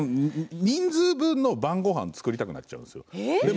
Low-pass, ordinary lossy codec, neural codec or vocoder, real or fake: none; none; none; real